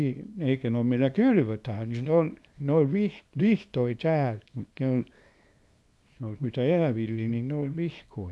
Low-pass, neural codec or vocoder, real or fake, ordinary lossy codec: none; codec, 24 kHz, 0.9 kbps, WavTokenizer, small release; fake; none